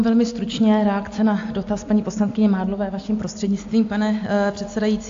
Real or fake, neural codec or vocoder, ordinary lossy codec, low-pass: real; none; AAC, 48 kbps; 7.2 kHz